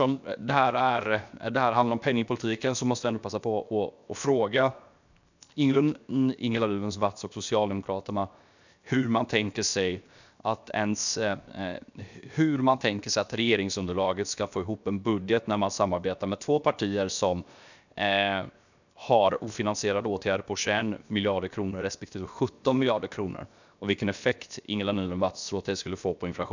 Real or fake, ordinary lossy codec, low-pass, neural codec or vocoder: fake; none; 7.2 kHz; codec, 16 kHz, 0.7 kbps, FocalCodec